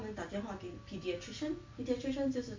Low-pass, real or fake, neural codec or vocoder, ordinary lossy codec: 7.2 kHz; real; none; MP3, 48 kbps